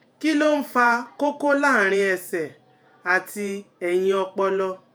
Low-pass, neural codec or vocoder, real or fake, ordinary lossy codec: none; vocoder, 48 kHz, 128 mel bands, Vocos; fake; none